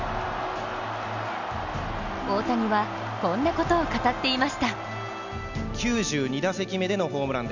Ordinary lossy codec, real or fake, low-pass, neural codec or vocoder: none; real; 7.2 kHz; none